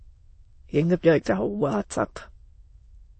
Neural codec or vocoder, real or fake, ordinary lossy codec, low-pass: autoencoder, 22.05 kHz, a latent of 192 numbers a frame, VITS, trained on many speakers; fake; MP3, 32 kbps; 9.9 kHz